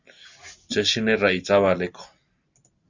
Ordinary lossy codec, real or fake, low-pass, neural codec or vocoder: Opus, 64 kbps; real; 7.2 kHz; none